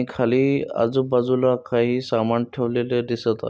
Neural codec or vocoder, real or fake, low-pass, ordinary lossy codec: none; real; none; none